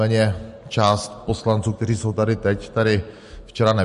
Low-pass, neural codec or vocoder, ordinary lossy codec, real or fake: 14.4 kHz; none; MP3, 48 kbps; real